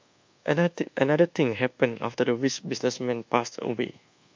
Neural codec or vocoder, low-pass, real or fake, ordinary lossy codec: codec, 24 kHz, 1.2 kbps, DualCodec; 7.2 kHz; fake; MP3, 64 kbps